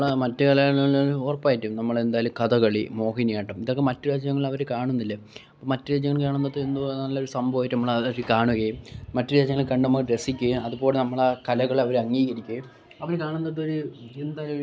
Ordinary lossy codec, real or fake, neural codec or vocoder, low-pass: none; real; none; none